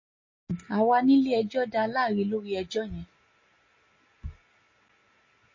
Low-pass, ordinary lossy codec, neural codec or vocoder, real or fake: 7.2 kHz; MP3, 32 kbps; none; real